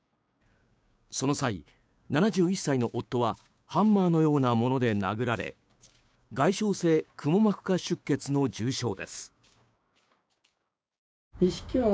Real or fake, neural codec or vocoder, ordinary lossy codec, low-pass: fake; codec, 16 kHz, 6 kbps, DAC; none; none